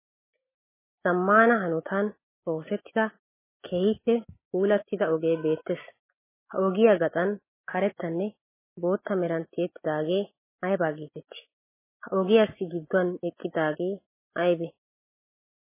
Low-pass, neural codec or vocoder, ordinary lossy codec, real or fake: 3.6 kHz; none; MP3, 16 kbps; real